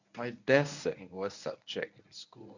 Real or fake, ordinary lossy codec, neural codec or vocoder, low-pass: fake; none; codec, 24 kHz, 0.9 kbps, WavTokenizer, medium speech release version 1; 7.2 kHz